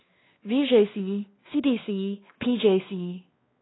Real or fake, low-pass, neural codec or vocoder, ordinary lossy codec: fake; 7.2 kHz; codec, 16 kHz, 2 kbps, X-Codec, WavLM features, trained on Multilingual LibriSpeech; AAC, 16 kbps